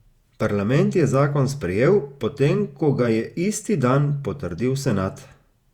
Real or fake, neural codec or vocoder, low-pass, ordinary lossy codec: fake; vocoder, 48 kHz, 128 mel bands, Vocos; 19.8 kHz; Opus, 64 kbps